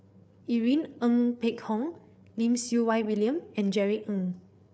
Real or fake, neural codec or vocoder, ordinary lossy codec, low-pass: fake; codec, 16 kHz, 8 kbps, FreqCodec, larger model; none; none